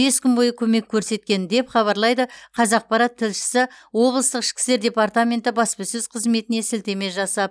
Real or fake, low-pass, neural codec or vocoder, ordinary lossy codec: real; none; none; none